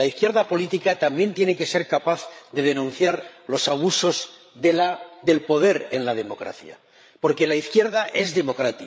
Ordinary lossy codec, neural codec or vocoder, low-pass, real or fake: none; codec, 16 kHz, 8 kbps, FreqCodec, larger model; none; fake